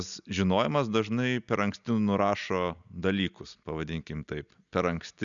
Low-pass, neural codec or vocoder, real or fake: 7.2 kHz; none; real